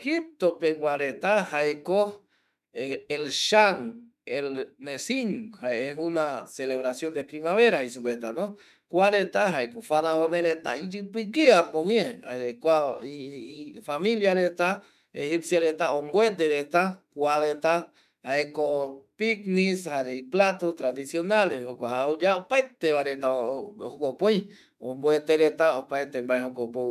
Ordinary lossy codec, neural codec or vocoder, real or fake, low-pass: none; autoencoder, 48 kHz, 32 numbers a frame, DAC-VAE, trained on Japanese speech; fake; 14.4 kHz